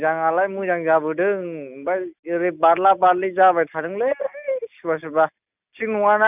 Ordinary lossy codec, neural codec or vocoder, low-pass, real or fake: none; none; 3.6 kHz; real